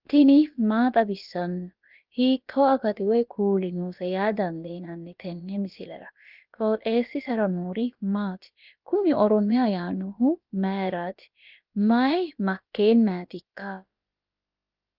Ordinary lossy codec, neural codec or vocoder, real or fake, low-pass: Opus, 24 kbps; codec, 16 kHz, about 1 kbps, DyCAST, with the encoder's durations; fake; 5.4 kHz